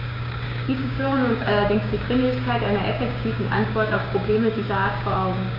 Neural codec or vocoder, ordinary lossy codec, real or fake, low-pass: codec, 16 kHz, 6 kbps, DAC; none; fake; 5.4 kHz